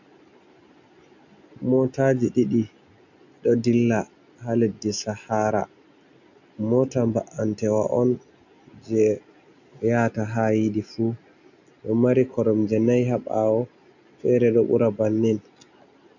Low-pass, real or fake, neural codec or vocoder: 7.2 kHz; real; none